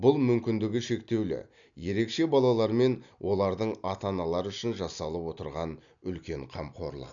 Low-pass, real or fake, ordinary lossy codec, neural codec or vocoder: 7.2 kHz; real; none; none